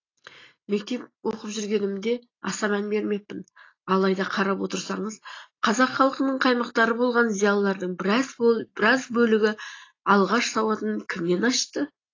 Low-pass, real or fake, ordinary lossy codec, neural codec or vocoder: 7.2 kHz; real; AAC, 32 kbps; none